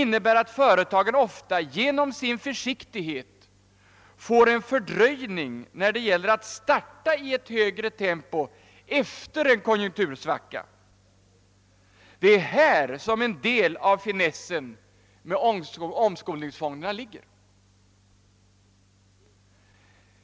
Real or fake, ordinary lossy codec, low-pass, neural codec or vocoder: real; none; none; none